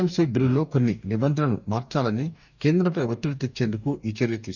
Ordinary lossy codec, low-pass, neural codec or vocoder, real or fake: none; 7.2 kHz; codec, 44.1 kHz, 2.6 kbps, DAC; fake